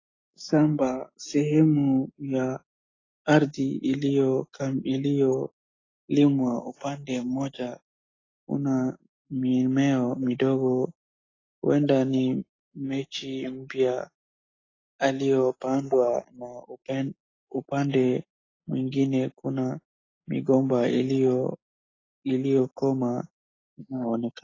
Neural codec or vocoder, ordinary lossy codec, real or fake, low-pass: none; AAC, 32 kbps; real; 7.2 kHz